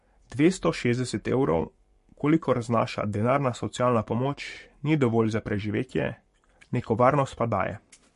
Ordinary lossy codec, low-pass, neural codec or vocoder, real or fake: MP3, 48 kbps; 14.4 kHz; codec, 44.1 kHz, 7.8 kbps, Pupu-Codec; fake